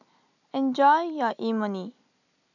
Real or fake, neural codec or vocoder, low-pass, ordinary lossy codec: real; none; 7.2 kHz; none